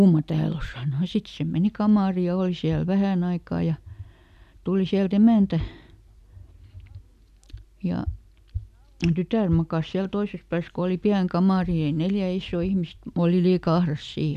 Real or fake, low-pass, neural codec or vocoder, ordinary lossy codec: real; 14.4 kHz; none; none